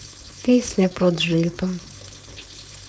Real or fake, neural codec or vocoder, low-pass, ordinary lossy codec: fake; codec, 16 kHz, 4.8 kbps, FACodec; none; none